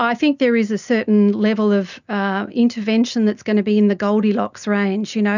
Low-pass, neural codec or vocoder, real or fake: 7.2 kHz; none; real